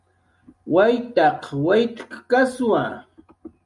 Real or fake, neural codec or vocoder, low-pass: real; none; 10.8 kHz